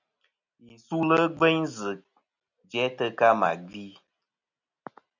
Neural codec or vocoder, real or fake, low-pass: none; real; 7.2 kHz